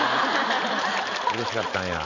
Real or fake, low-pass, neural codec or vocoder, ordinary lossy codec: real; 7.2 kHz; none; none